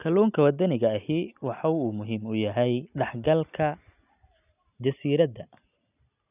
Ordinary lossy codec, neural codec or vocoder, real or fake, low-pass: none; none; real; 3.6 kHz